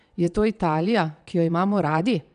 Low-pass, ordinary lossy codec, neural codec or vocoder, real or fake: 9.9 kHz; none; vocoder, 22.05 kHz, 80 mel bands, WaveNeXt; fake